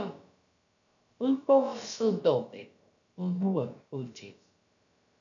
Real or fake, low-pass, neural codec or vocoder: fake; 7.2 kHz; codec, 16 kHz, about 1 kbps, DyCAST, with the encoder's durations